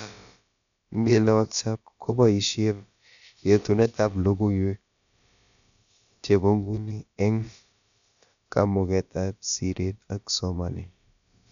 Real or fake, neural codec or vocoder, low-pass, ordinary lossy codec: fake; codec, 16 kHz, about 1 kbps, DyCAST, with the encoder's durations; 7.2 kHz; none